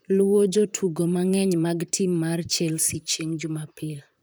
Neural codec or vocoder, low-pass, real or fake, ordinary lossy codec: vocoder, 44.1 kHz, 128 mel bands, Pupu-Vocoder; none; fake; none